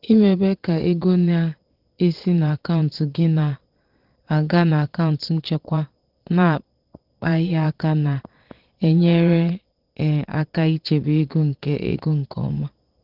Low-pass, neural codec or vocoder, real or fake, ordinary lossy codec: 5.4 kHz; vocoder, 22.05 kHz, 80 mel bands, Vocos; fake; Opus, 16 kbps